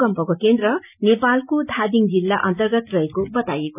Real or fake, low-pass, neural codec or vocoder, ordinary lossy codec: real; 3.6 kHz; none; none